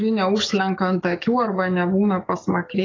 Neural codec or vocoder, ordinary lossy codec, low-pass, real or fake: codec, 44.1 kHz, 7.8 kbps, DAC; AAC, 32 kbps; 7.2 kHz; fake